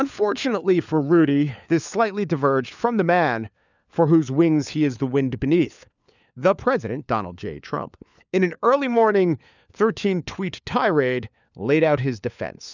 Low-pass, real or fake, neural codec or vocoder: 7.2 kHz; fake; codec, 16 kHz, 4 kbps, FunCodec, trained on LibriTTS, 50 frames a second